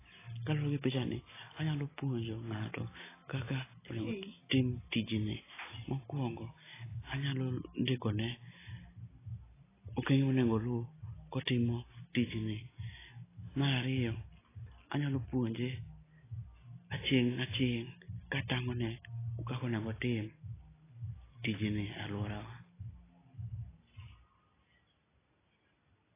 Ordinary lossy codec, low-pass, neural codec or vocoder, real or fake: AAC, 16 kbps; 3.6 kHz; none; real